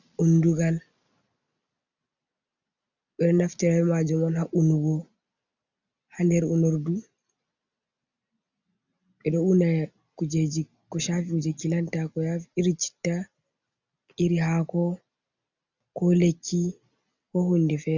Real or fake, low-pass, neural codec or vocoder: real; 7.2 kHz; none